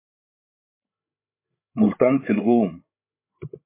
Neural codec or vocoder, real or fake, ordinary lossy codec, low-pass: codec, 16 kHz, 16 kbps, FreqCodec, larger model; fake; MP3, 24 kbps; 3.6 kHz